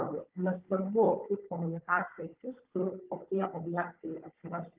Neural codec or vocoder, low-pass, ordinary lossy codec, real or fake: codec, 16 kHz, 16 kbps, FunCodec, trained on Chinese and English, 50 frames a second; 3.6 kHz; Opus, 24 kbps; fake